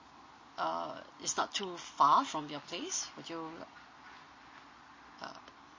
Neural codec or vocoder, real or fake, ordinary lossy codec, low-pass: none; real; MP3, 32 kbps; 7.2 kHz